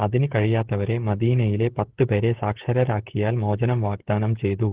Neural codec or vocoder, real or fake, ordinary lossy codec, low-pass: codec, 16 kHz, 16 kbps, FreqCodec, smaller model; fake; Opus, 16 kbps; 3.6 kHz